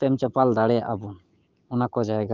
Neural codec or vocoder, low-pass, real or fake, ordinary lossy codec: codec, 16 kHz, 6 kbps, DAC; 7.2 kHz; fake; Opus, 32 kbps